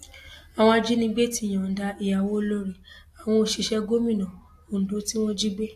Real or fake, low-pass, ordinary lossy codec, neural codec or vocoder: real; 14.4 kHz; AAC, 64 kbps; none